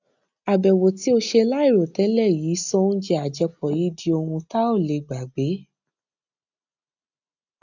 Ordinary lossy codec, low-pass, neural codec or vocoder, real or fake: none; 7.2 kHz; none; real